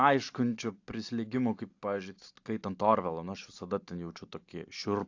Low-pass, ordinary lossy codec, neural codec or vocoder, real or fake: 7.2 kHz; AAC, 48 kbps; none; real